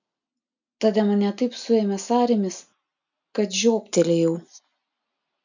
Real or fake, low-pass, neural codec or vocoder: real; 7.2 kHz; none